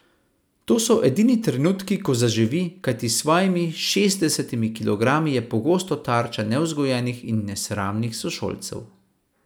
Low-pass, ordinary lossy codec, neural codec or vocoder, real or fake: none; none; none; real